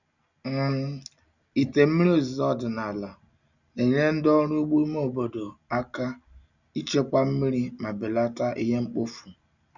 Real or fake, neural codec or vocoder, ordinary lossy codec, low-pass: real; none; none; 7.2 kHz